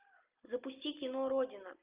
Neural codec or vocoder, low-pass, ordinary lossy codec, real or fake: none; 3.6 kHz; Opus, 24 kbps; real